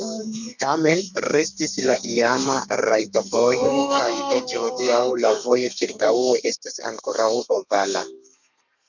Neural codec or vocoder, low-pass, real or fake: codec, 32 kHz, 1.9 kbps, SNAC; 7.2 kHz; fake